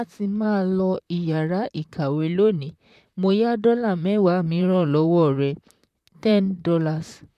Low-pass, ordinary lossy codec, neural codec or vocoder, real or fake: 14.4 kHz; MP3, 96 kbps; vocoder, 44.1 kHz, 128 mel bands, Pupu-Vocoder; fake